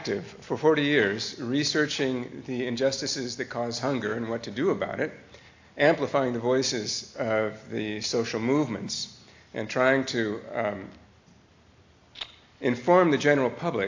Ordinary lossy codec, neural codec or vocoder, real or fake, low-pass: AAC, 48 kbps; none; real; 7.2 kHz